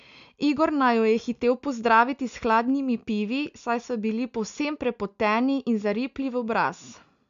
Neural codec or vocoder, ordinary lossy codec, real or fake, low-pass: none; none; real; 7.2 kHz